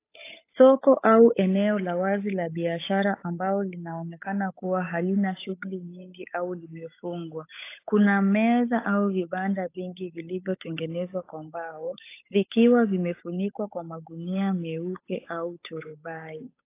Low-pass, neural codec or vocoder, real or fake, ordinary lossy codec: 3.6 kHz; codec, 16 kHz, 8 kbps, FunCodec, trained on Chinese and English, 25 frames a second; fake; AAC, 24 kbps